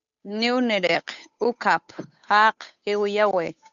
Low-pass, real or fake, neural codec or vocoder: 7.2 kHz; fake; codec, 16 kHz, 8 kbps, FunCodec, trained on Chinese and English, 25 frames a second